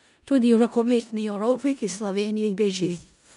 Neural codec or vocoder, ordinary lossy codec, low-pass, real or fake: codec, 16 kHz in and 24 kHz out, 0.4 kbps, LongCat-Audio-Codec, four codebook decoder; none; 10.8 kHz; fake